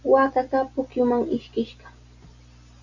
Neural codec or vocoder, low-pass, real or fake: none; 7.2 kHz; real